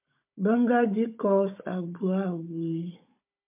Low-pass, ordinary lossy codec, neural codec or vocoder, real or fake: 3.6 kHz; MP3, 32 kbps; codec, 16 kHz, 16 kbps, FunCodec, trained on Chinese and English, 50 frames a second; fake